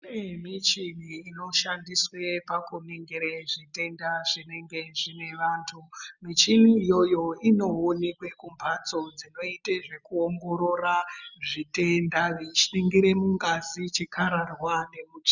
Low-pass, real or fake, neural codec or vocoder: 7.2 kHz; real; none